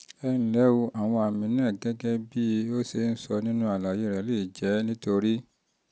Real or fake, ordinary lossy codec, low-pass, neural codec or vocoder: real; none; none; none